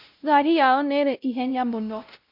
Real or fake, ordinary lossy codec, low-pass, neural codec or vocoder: fake; MP3, 48 kbps; 5.4 kHz; codec, 16 kHz, 0.5 kbps, X-Codec, WavLM features, trained on Multilingual LibriSpeech